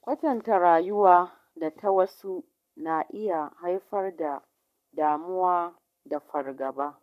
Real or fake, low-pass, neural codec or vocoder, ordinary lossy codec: fake; 14.4 kHz; codec, 44.1 kHz, 7.8 kbps, Pupu-Codec; MP3, 96 kbps